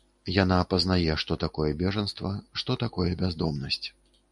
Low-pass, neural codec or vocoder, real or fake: 10.8 kHz; none; real